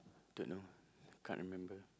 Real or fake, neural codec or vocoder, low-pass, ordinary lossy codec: real; none; none; none